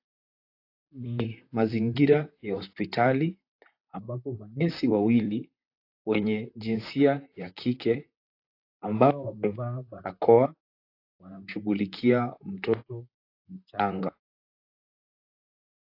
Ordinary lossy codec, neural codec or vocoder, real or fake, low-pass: AAC, 32 kbps; vocoder, 44.1 kHz, 128 mel bands, Pupu-Vocoder; fake; 5.4 kHz